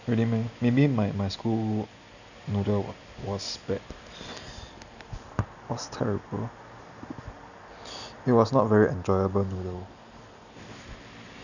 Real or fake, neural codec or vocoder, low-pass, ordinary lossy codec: real; none; 7.2 kHz; none